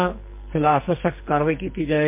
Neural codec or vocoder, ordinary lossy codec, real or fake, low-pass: codec, 44.1 kHz, 2.6 kbps, SNAC; MP3, 32 kbps; fake; 3.6 kHz